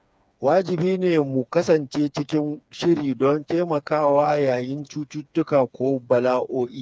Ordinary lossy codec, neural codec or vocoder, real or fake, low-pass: none; codec, 16 kHz, 4 kbps, FreqCodec, smaller model; fake; none